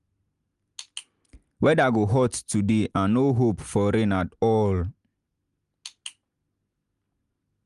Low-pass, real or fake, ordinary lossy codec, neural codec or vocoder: 10.8 kHz; real; Opus, 32 kbps; none